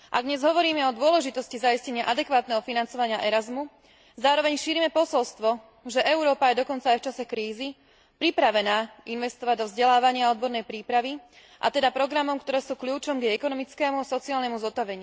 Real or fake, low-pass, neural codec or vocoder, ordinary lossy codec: real; none; none; none